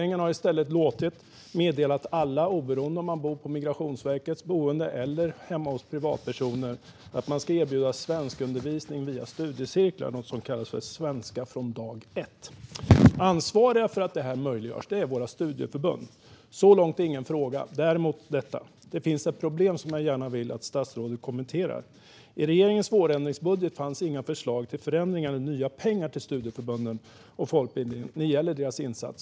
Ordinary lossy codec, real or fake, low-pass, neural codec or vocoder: none; real; none; none